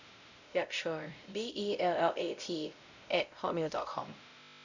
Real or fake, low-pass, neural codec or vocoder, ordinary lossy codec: fake; 7.2 kHz; codec, 16 kHz, 0.5 kbps, X-Codec, WavLM features, trained on Multilingual LibriSpeech; none